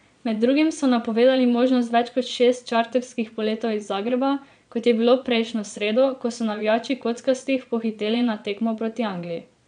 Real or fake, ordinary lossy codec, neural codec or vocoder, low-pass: fake; MP3, 96 kbps; vocoder, 22.05 kHz, 80 mel bands, Vocos; 9.9 kHz